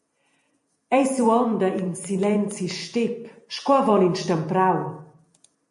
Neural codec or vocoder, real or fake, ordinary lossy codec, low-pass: vocoder, 48 kHz, 128 mel bands, Vocos; fake; MP3, 48 kbps; 14.4 kHz